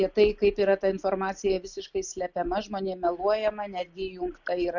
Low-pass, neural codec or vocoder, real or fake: 7.2 kHz; none; real